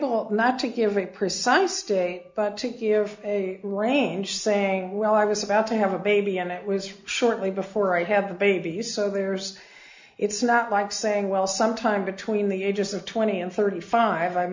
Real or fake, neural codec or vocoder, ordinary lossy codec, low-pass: real; none; MP3, 64 kbps; 7.2 kHz